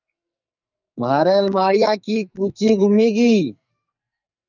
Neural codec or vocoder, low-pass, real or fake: codec, 44.1 kHz, 2.6 kbps, SNAC; 7.2 kHz; fake